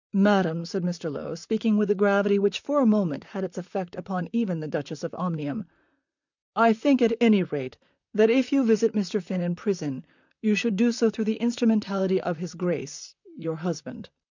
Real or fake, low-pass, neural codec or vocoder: fake; 7.2 kHz; vocoder, 44.1 kHz, 128 mel bands, Pupu-Vocoder